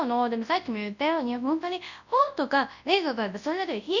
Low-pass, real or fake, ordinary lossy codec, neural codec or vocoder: 7.2 kHz; fake; none; codec, 24 kHz, 0.9 kbps, WavTokenizer, large speech release